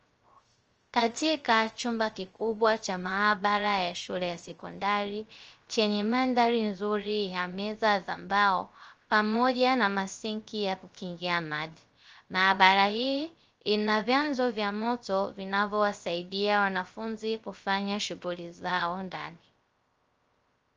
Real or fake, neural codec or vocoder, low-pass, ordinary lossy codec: fake; codec, 16 kHz, 0.3 kbps, FocalCodec; 7.2 kHz; Opus, 24 kbps